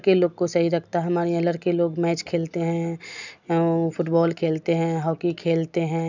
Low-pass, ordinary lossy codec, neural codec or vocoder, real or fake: 7.2 kHz; none; none; real